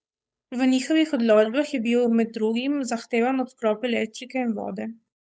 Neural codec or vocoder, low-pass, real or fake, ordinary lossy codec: codec, 16 kHz, 8 kbps, FunCodec, trained on Chinese and English, 25 frames a second; none; fake; none